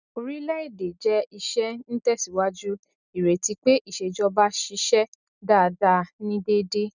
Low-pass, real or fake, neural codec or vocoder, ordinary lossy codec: 7.2 kHz; real; none; none